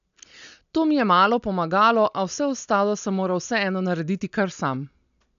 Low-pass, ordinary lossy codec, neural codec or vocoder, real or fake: 7.2 kHz; none; none; real